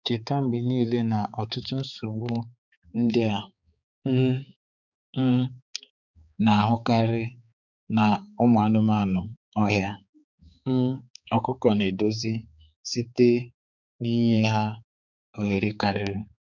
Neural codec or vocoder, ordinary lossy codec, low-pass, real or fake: codec, 16 kHz, 4 kbps, X-Codec, HuBERT features, trained on balanced general audio; none; 7.2 kHz; fake